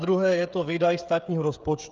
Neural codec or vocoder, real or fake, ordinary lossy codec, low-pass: codec, 16 kHz, 16 kbps, FreqCodec, smaller model; fake; Opus, 24 kbps; 7.2 kHz